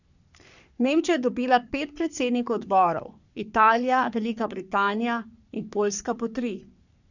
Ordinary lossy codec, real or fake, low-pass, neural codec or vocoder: none; fake; 7.2 kHz; codec, 44.1 kHz, 3.4 kbps, Pupu-Codec